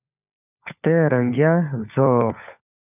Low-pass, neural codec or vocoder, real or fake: 3.6 kHz; codec, 16 kHz, 4 kbps, FunCodec, trained on LibriTTS, 50 frames a second; fake